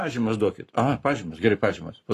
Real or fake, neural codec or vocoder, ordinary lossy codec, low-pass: fake; codec, 44.1 kHz, 7.8 kbps, Pupu-Codec; AAC, 48 kbps; 14.4 kHz